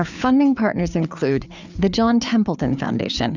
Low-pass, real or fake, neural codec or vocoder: 7.2 kHz; fake; codec, 16 kHz, 4 kbps, FreqCodec, larger model